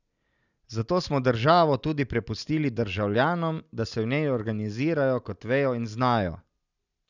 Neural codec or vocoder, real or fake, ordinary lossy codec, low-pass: none; real; none; 7.2 kHz